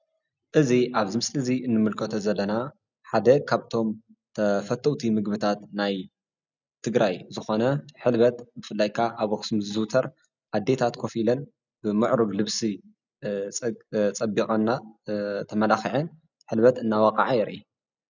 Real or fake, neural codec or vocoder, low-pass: real; none; 7.2 kHz